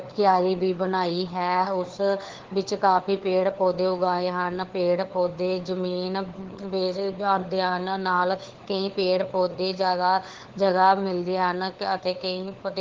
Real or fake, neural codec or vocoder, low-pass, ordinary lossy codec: fake; codec, 16 kHz, 4 kbps, FunCodec, trained on LibriTTS, 50 frames a second; 7.2 kHz; Opus, 16 kbps